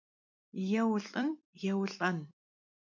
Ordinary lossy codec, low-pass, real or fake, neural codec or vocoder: MP3, 64 kbps; 7.2 kHz; fake; vocoder, 44.1 kHz, 128 mel bands every 256 samples, BigVGAN v2